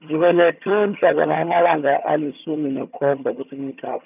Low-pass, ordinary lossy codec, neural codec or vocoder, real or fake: 3.6 kHz; none; vocoder, 22.05 kHz, 80 mel bands, HiFi-GAN; fake